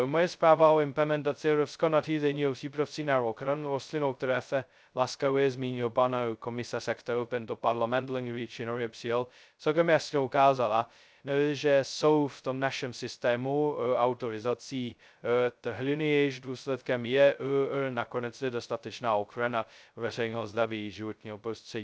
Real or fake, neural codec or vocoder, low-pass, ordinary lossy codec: fake; codec, 16 kHz, 0.2 kbps, FocalCodec; none; none